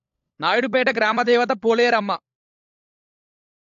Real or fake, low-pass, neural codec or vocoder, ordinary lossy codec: fake; 7.2 kHz; codec, 16 kHz, 16 kbps, FunCodec, trained on LibriTTS, 50 frames a second; AAC, 64 kbps